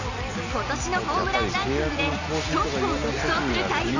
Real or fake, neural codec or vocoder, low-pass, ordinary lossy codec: real; none; 7.2 kHz; none